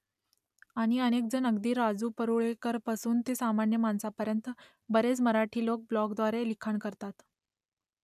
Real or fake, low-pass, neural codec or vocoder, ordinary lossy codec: real; 14.4 kHz; none; none